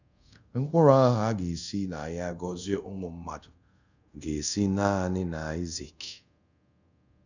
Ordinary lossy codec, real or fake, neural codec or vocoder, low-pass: none; fake; codec, 24 kHz, 0.5 kbps, DualCodec; 7.2 kHz